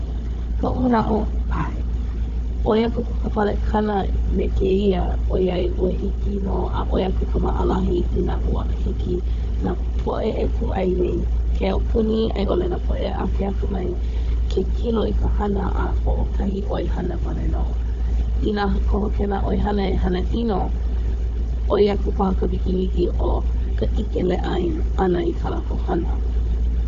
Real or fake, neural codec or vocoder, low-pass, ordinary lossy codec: fake; codec, 16 kHz, 4 kbps, FunCodec, trained on Chinese and English, 50 frames a second; 7.2 kHz; none